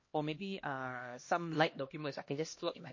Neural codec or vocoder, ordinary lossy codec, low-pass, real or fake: codec, 16 kHz, 1 kbps, X-Codec, HuBERT features, trained on LibriSpeech; MP3, 32 kbps; 7.2 kHz; fake